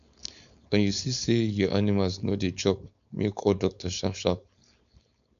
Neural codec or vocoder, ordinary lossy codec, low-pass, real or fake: codec, 16 kHz, 4.8 kbps, FACodec; none; 7.2 kHz; fake